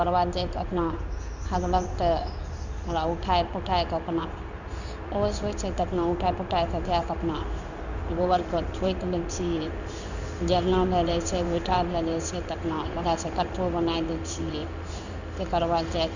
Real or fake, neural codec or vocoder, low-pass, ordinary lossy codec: fake; codec, 16 kHz in and 24 kHz out, 1 kbps, XY-Tokenizer; 7.2 kHz; none